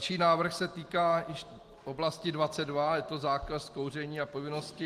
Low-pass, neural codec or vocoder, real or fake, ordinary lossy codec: 14.4 kHz; none; real; Opus, 24 kbps